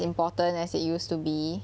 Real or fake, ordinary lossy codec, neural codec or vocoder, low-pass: real; none; none; none